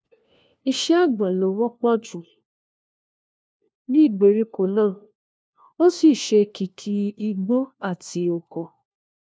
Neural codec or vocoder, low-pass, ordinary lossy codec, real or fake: codec, 16 kHz, 1 kbps, FunCodec, trained on LibriTTS, 50 frames a second; none; none; fake